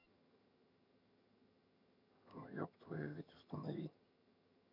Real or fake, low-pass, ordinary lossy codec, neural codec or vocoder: fake; 5.4 kHz; none; vocoder, 22.05 kHz, 80 mel bands, HiFi-GAN